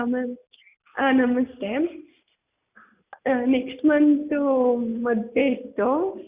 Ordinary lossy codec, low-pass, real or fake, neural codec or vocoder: Opus, 64 kbps; 3.6 kHz; real; none